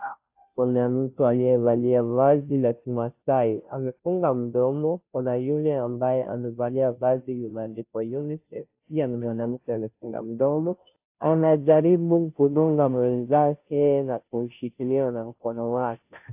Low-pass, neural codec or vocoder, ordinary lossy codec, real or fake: 3.6 kHz; codec, 16 kHz, 0.5 kbps, FunCodec, trained on Chinese and English, 25 frames a second; AAC, 32 kbps; fake